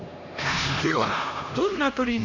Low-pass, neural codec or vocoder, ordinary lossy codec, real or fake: 7.2 kHz; codec, 16 kHz, 1 kbps, X-Codec, HuBERT features, trained on LibriSpeech; none; fake